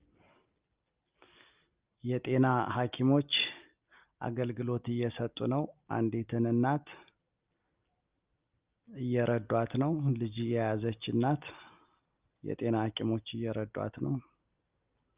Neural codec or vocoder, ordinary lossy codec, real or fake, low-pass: none; Opus, 24 kbps; real; 3.6 kHz